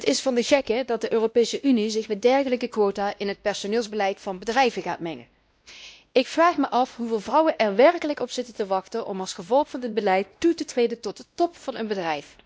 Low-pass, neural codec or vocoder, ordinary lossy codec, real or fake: none; codec, 16 kHz, 1 kbps, X-Codec, WavLM features, trained on Multilingual LibriSpeech; none; fake